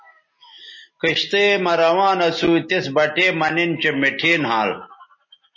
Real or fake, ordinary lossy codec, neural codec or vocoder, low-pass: real; MP3, 32 kbps; none; 7.2 kHz